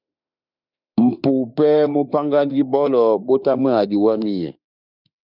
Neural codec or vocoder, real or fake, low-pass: autoencoder, 48 kHz, 32 numbers a frame, DAC-VAE, trained on Japanese speech; fake; 5.4 kHz